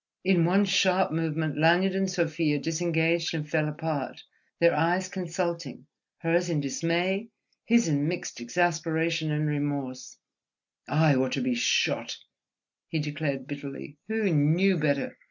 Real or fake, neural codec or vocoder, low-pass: real; none; 7.2 kHz